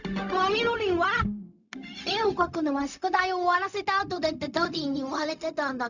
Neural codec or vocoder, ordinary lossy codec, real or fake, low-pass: codec, 16 kHz, 0.4 kbps, LongCat-Audio-Codec; none; fake; 7.2 kHz